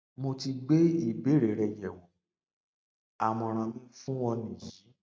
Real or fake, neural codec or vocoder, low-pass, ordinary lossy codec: real; none; none; none